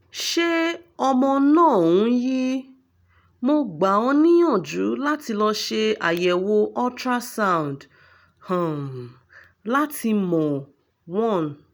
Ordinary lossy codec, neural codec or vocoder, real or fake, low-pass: none; none; real; none